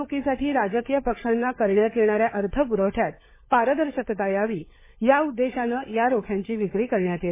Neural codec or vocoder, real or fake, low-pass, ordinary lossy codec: codec, 16 kHz, 4 kbps, FunCodec, trained on LibriTTS, 50 frames a second; fake; 3.6 kHz; MP3, 16 kbps